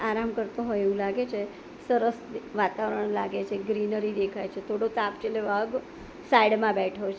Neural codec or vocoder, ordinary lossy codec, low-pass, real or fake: none; none; none; real